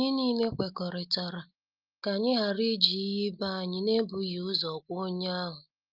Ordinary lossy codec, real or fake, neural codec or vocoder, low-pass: Opus, 24 kbps; real; none; 5.4 kHz